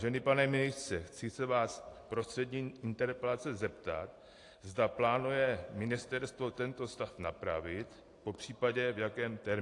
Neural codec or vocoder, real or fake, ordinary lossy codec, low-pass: none; real; AAC, 48 kbps; 10.8 kHz